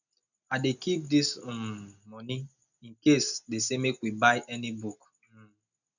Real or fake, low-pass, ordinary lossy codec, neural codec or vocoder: real; 7.2 kHz; none; none